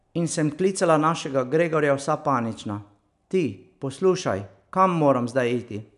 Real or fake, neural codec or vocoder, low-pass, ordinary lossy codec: real; none; 10.8 kHz; none